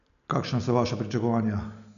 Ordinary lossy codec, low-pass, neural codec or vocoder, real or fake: none; 7.2 kHz; none; real